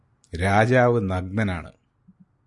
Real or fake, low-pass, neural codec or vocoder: real; 10.8 kHz; none